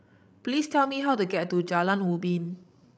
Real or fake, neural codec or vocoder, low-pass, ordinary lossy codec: fake; codec, 16 kHz, 16 kbps, FreqCodec, larger model; none; none